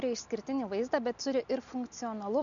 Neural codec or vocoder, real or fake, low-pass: none; real; 7.2 kHz